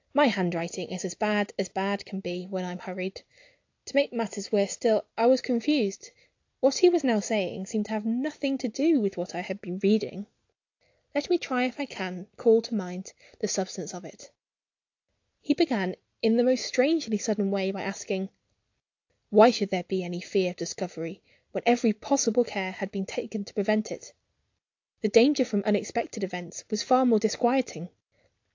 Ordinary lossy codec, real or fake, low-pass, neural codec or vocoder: AAC, 48 kbps; real; 7.2 kHz; none